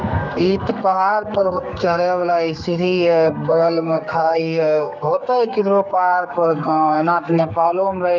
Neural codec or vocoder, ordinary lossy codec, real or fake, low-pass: codec, 32 kHz, 1.9 kbps, SNAC; none; fake; 7.2 kHz